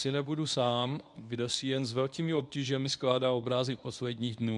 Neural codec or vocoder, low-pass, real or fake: codec, 24 kHz, 0.9 kbps, WavTokenizer, medium speech release version 1; 10.8 kHz; fake